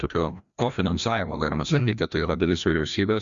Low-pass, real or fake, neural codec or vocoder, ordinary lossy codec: 7.2 kHz; fake; codec, 16 kHz, 1 kbps, FreqCodec, larger model; Opus, 64 kbps